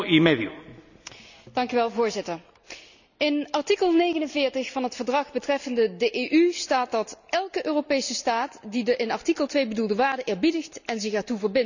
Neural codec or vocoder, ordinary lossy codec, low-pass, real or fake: none; none; 7.2 kHz; real